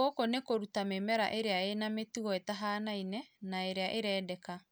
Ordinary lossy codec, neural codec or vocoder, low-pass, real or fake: none; none; none; real